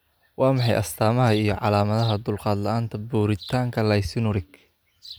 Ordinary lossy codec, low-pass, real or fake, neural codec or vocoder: none; none; real; none